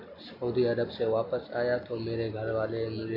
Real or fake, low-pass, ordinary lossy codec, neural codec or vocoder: real; 5.4 kHz; AAC, 32 kbps; none